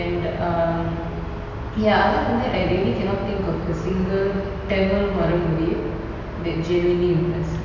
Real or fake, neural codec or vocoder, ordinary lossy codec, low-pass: real; none; none; 7.2 kHz